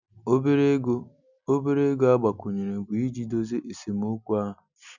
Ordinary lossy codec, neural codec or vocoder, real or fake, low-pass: none; none; real; 7.2 kHz